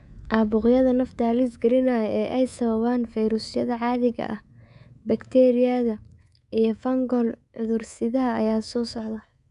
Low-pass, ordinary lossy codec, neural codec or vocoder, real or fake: 10.8 kHz; none; codec, 24 kHz, 3.1 kbps, DualCodec; fake